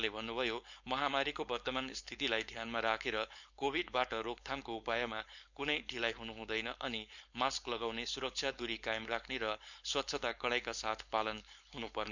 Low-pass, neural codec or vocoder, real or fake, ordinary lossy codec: 7.2 kHz; codec, 16 kHz, 4.8 kbps, FACodec; fake; none